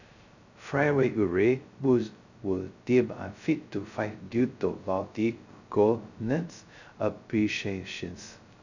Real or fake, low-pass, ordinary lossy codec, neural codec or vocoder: fake; 7.2 kHz; none; codec, 16 kHz, 0.2 kbps, FocalCodec